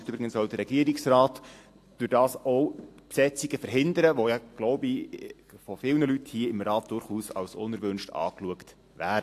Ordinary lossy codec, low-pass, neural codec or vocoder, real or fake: AAC, 64 kbps; 14.4 kHz; none; real